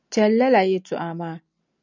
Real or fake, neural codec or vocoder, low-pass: real; none; 7.2 kHz